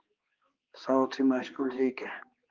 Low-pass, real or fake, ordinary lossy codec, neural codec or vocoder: 7.2 kHz; fake; Opus, 32 kbps; codec, 16 kHz, 2 kbps, X-Codec, HuBERT features, trained on balanced general audio